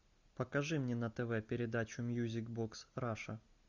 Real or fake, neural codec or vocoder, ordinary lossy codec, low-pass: real; none; Opus, 64 kbps; 7.2 kHz